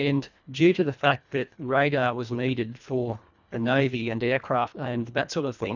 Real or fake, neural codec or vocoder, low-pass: fake; codec, 24 kHz, 1.5 kbps, HILCodec; 7.2 kHz